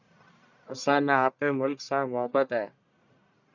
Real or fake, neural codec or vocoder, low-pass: fake; codec, 44.1 kHz, 1.7 kbps, Pupu-Codec; 7.2 kHz